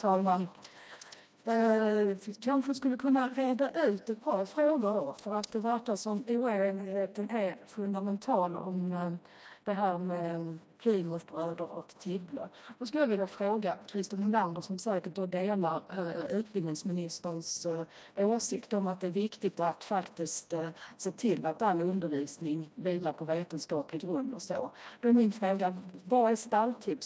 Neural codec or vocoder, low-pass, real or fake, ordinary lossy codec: codec, 16 kHz, 1 kbps, FreqCodec, smaller model; none; fake; none